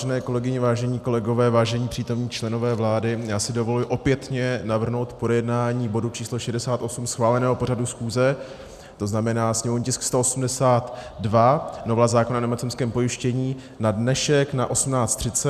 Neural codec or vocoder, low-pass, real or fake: none; 14.4 kHz; real